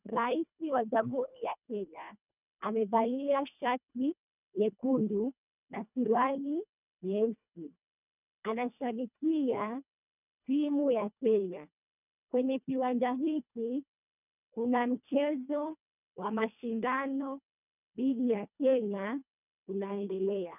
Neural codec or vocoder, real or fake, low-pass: codec, 24 kHz, 1.5 kbps, HILCodec; fake; 3.6 kHz